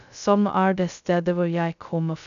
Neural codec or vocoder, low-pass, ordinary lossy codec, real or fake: codec, 16 kHz, 0.2 kbps, FocalCodec; 7.2 kHz; none; fake